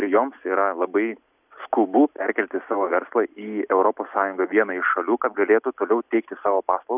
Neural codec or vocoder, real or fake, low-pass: none; real; 3.6 kHz